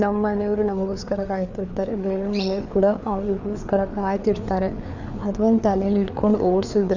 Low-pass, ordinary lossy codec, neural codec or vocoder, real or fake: 7.2 kHz; none; codec, 16 kHz, 4 kbps, FreqCodec, larger model; fake